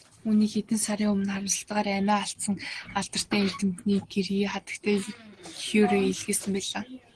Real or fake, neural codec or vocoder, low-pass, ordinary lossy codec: real; none; 9.9 kHz; Opus, 16 kbps